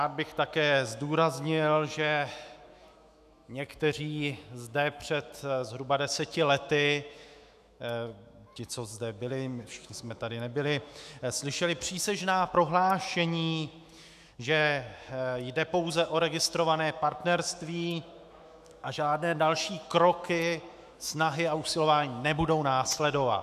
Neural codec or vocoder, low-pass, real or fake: autoencoder, 48 kHz, 128 numbers a frame, DAC-VAE, trained on Japanese speech; 14.4 kHz; fake